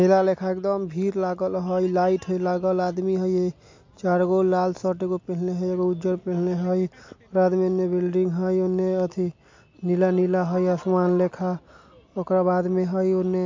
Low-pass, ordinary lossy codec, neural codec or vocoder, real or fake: 7.2 kHz; MP3, 48 kbps; none; real